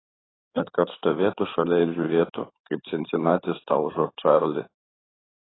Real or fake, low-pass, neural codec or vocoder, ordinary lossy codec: fake; 7.2 kHz; codec, 16 kHz in and 24 kHz out, 2.2 kbps, FireRedTTS-2 codec; AAC, 16 kbps